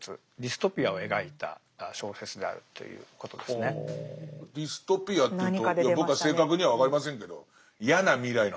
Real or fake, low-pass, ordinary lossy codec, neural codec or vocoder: real; none; none; none